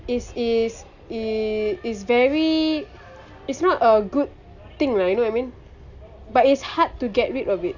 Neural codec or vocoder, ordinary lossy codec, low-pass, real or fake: none; none; 7.2 kHz; real